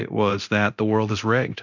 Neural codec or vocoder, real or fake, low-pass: codec, 24 kHz, 0.9 kbps, DualCodec; fake; 7.2 kHz